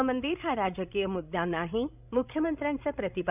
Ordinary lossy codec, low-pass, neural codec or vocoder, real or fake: none; 3.6 kHz; codec, 16 kHz, 16 kbps, FreqCodec, larger model; fake